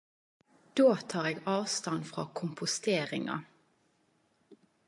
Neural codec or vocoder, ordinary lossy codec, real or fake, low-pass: none; AAC, 64 kbps; real; 10.8 kHz